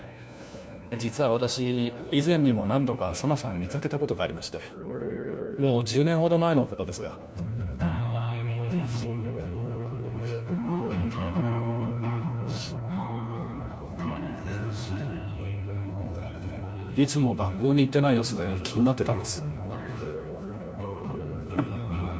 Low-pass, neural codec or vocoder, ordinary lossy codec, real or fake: none; codec, 16 kHz, 1 kbps, FunCodec, trained on LibriTTS, 50 frames a second; none; fake